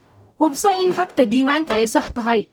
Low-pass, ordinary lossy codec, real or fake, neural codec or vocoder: none; none; fake; codec, 44.1 kHz, 0.9 kbps, DAC